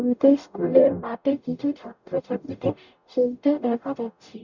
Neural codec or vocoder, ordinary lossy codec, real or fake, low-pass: codec, 44.1 kHz, 0.9 kbps, DAC; none; fake; 7.2 kHz